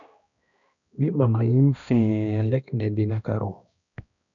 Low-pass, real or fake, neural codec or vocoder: 7.2 kHz; fake; codec, 16 kHz, 1 kbps, X-Codec, HuBERT features, trained on balanced general audio